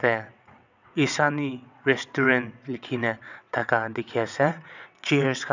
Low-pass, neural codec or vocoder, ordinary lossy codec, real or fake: 7.2 kHz; vocoder, 22.05 kHz, 80 mel bands, WaveNeXt; none; fake